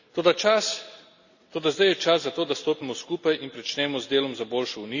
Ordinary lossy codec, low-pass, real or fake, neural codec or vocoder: none; 7.2 kHz; real; none